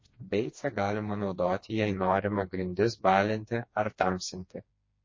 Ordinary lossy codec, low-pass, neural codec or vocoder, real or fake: MP3, 32 kbps; 7.2 kHz; codec, 16 kHz, 2 kbps, FreqCodec, smaller model; fake